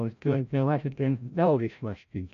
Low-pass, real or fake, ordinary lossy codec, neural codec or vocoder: 7.2 kHz; fake; none; codec, 16 kHz, 0.5 kbps, FreqCodec, larger model